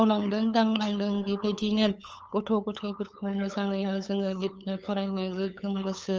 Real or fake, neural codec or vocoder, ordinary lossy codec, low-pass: fake; codec, 16 kHz, 8 kbps, FunCodec, trained on LibriTTS, 25 frames a second; Opus, 24 kbps; 7.2 kHz